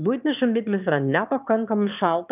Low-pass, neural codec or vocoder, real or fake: 3.6 kHz; autoencoder, 22.05 kHz, a latent of 192 numbers a frame, VITS, trained on one speaker; fake